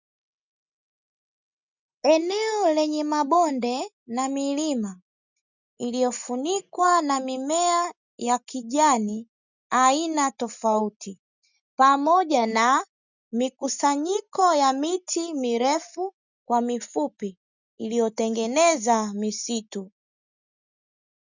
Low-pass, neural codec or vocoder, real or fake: 7.2 kHz; none; real